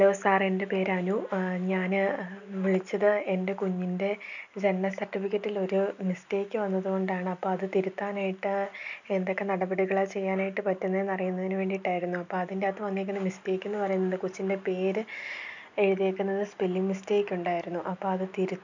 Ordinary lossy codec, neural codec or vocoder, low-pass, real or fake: none; none; 7.2 kHz; real